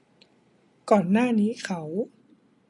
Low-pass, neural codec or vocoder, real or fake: 10.8 kHz; none; real